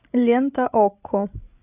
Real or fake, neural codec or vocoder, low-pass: fake; codec, 16 kHz, 16 kbps, FreqCodec, larger model; 3.6 kHz